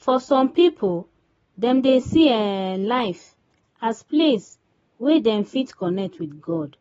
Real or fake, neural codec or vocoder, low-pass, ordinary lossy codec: real; none; 19.8 kHz; AAC, 24 kbps